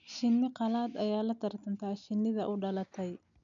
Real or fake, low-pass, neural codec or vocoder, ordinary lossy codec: real; 7.2 kHz; none; MP3, 96 kbps